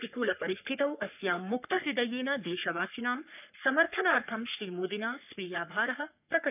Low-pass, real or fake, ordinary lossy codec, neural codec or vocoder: 3.6 kHz; fake; none; codec, 44.1 kHz, 3.4 kbps, Pupu-Codec